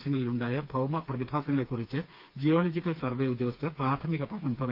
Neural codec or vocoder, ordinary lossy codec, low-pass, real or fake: codec, 16 kHz, 4 kbps, FreqCodec, smaller model; Opus, 24 kbps; 5.4 kHz; fake